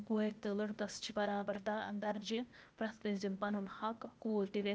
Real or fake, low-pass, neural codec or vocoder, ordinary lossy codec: fake; none; codec, 16 kHz, 0.8 kbps, ZipCodec; none